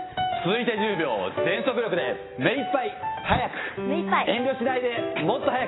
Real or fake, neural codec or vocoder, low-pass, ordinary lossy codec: real; none; 7.2 kHz; AAC, 16 kbps